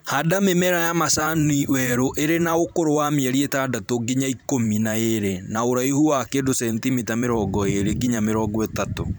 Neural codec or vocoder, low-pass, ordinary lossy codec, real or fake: vocoder, 44.1 kHz, 128 mel bands every 512 samples, BigVGAN v2; none; none; fake